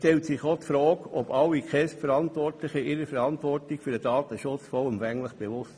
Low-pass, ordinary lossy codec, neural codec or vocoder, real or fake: none; none; none; real